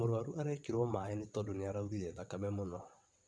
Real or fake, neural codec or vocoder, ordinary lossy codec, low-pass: fake; vocoder, 22.05 kHz, 80 mel bands, WaveNeXt; none; none